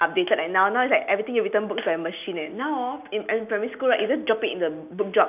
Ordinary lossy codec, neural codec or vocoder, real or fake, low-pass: none; none; real; 3.6 kHz